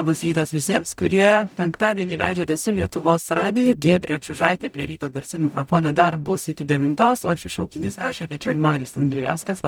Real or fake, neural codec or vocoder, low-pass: fake; codec, 44.1 kHz, 0.9 kbps, DAC; 19.8 kHz